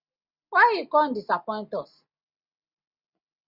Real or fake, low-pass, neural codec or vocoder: real; 5.4 kHz; none